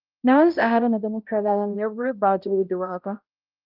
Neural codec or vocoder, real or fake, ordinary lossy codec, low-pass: codec, 16 kHz, 0.5 kbps, X-Codec, HuBERT features, trained on balanced general audio; fake; Opus, 32 kbps; 5.4 kHz